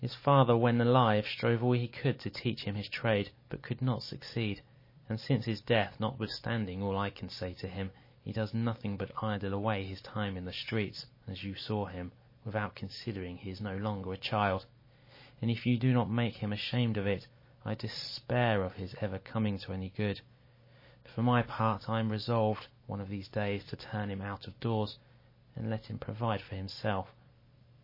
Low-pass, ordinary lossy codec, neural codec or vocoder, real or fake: 5.4 kHz; MP3, 24 kbps; none; real